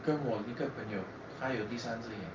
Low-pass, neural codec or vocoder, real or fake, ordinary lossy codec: 7.2 kHz; none; real; Opus, 24 kbps